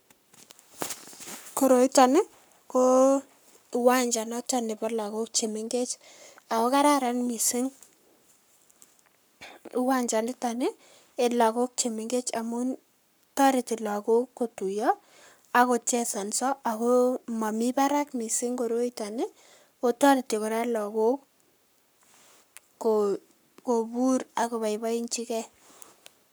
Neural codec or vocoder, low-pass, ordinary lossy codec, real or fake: codec, 44.1 kHz, 7.8 kbps, Pupu-Codec; none; none; fake